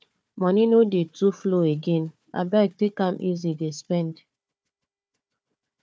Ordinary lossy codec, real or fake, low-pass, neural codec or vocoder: none; fake; none; codec, 16 kHz, 4 kbps, FunCodec, trained on Chinese and English, 50 frames a second